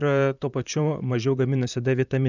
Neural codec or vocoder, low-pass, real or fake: vocoder, 44.1 kHz, 128 mel bands, Pupu-Vocoder; 7.2 kHz; fake